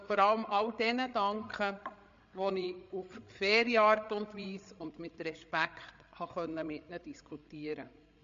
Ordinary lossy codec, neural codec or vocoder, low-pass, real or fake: MP3, 48 kbps; codec, 16 kHz, 8 kbps, FreqCodec, larger model; 7.2 kHz; fake